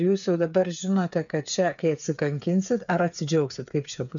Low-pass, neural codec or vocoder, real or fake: 7.2 kHz; codec, 16 kHz, 8 kbps, FreqCodec, smaller model; fake